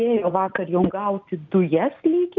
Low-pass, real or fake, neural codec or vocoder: 7.2 kHz; real; none